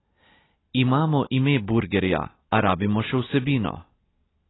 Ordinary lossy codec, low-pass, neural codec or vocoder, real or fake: AAC, 16 kbps; 7.2 kHz; none; real